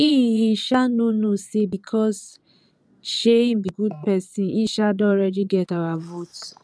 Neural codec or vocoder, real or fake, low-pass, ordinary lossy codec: vocoder, 22.05 kHz, 80 mel bands, Vocos; fake; none; none